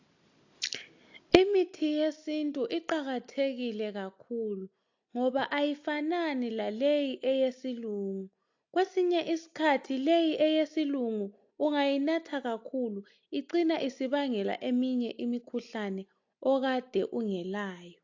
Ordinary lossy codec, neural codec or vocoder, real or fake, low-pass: AAC, 48 kbps; none; real; 7.2 kHz